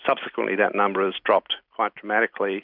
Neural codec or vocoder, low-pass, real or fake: none; 5.4 kHz; real